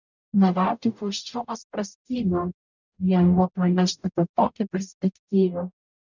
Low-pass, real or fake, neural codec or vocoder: 7.2 kHz; fake; codec, 44.1 kHz, 0.9 kbps, DAC